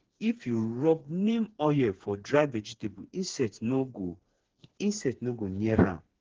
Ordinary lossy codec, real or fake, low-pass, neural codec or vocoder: Opus, 16 kbps; fake; 7.2 kHz; codec, 16 kHz, 4 kbps, FreqCodec, smaller model